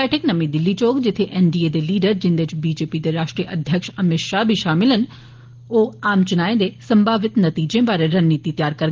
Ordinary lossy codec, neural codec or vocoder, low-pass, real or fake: Opus, 16 kbps; none; 7.2 kHz; real